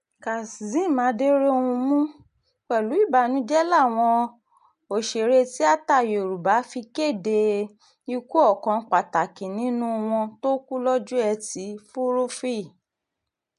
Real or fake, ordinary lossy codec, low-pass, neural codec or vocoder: real; MP3, 64 kbps; 10.8 kHz; none